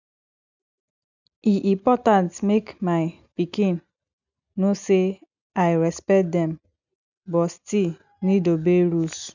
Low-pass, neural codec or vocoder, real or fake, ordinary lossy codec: 7.2 kHz; none; real; none